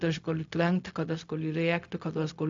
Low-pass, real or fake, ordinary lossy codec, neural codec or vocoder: 7.2 kHz; fake; AAC, 64 kbps; codec, 16 kHz, 0.4 kbps, LongCat-Audio-Codec